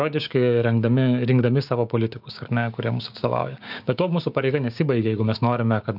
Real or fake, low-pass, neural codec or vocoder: fake; 5.4 kHz; codec, 16 kHz, 6 kbps, DAC